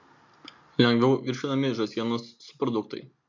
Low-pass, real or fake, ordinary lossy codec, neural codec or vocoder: 7.2 kHz; real; MP3, 48 kbps; none